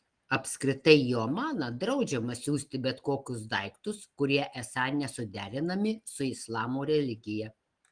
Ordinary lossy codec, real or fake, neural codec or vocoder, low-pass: Opus, 24 kbps; real; none; 9.9 kHz